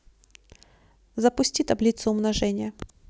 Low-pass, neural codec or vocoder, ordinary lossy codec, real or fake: none; none; none; real